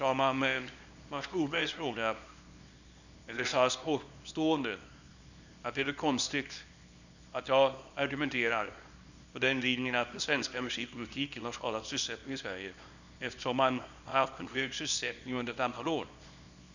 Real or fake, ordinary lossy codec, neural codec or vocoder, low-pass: fake; none; codec, 24 kHz, 0.9 kbps, WavTokenizer, small release; 7.2 kHz